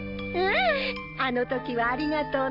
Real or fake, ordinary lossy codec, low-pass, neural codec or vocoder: real; none; 5.4 kHz; none